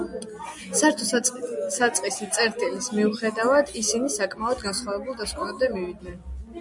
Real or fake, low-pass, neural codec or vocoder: real; 10.8 kHz; none